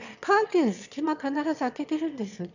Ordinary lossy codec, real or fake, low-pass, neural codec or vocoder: none; fake; 7.2 kHz; autoencoder, 22.05 kHz, a latent of 192 numbers a frame, VITS, trained on one speaker